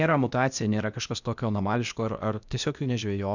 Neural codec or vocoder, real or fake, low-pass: codec, 16 kHz, 0.8 kbps, ZipCodec; fake; 7.2 kHz